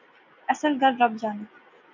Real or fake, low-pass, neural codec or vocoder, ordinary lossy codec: real; 7.2 kHz; none; MP3, 64 kbps